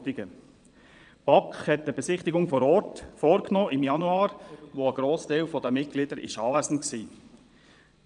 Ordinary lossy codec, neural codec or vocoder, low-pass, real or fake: none; vocoder, 22.05 kHz, 80 mel bands, Vocos; 9.9 kHz; fake